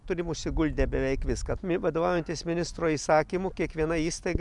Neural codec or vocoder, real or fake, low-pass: none; real; 10.8 kHz